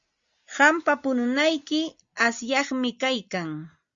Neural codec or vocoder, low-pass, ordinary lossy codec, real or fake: none; 7.2 kHz; Opus, 64 kbps; real